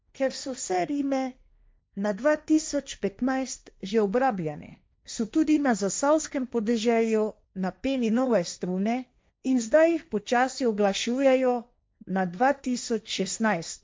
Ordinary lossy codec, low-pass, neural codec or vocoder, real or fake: none; none; codec, 16 kHz, 1.1 kbps, Voila-Tokenizer; fake